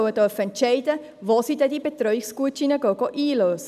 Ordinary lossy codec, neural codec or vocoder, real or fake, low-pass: none; none; real; 14.4 kHz